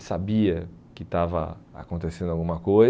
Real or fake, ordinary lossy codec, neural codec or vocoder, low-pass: real; none; none; none